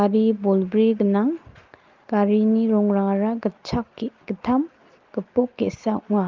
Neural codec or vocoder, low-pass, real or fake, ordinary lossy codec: none; 7.2 kHz; real; Opus, 24 kbps